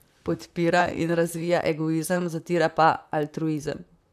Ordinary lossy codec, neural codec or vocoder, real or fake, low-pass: none; vocoder, 44.1 kHz, 128 mel bands, Pupu-Vocoder; fake; 14.4 kHz